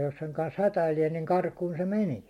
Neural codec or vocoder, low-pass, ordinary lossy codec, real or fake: none; 19.8 kHz; MP3, 64 kbps; real